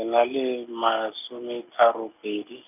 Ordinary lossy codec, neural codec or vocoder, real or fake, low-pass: none; none; real; 3.6 kHz